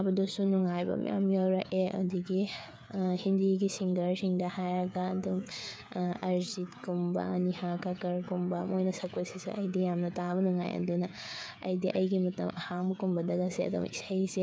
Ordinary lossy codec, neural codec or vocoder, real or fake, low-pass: none; codec, 16 kHz, 16 kbps, FreqCodec, smaller model; fake; none